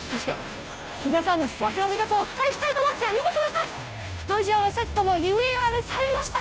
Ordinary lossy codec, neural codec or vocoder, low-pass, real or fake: none; codec, 16 kHz, 0.5 kbps, FunCodec, trained on Chinese and English, 25 frames a second; none; fake